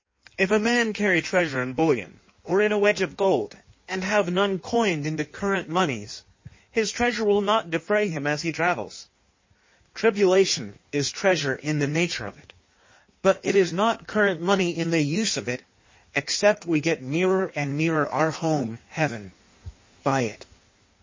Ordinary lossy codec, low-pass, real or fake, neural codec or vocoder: MP3, 32 kbps; 7.2 kHz; fake; codec, 16 kHz in and 24 kHz out, 1.1 kbps, FireRedTTS-2 codec